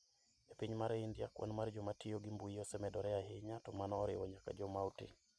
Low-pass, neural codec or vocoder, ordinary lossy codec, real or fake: none; none; none; real